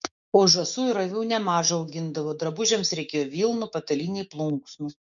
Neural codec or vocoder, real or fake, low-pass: codec, 16 kHz, 6 kbps, DAC; fake; 7.2 kHz